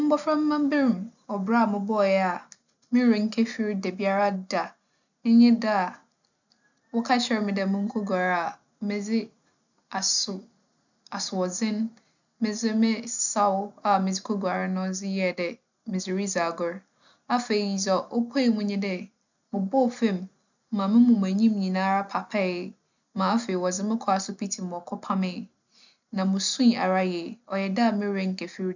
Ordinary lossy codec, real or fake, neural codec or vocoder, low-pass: none; real; none; 7.2 kHz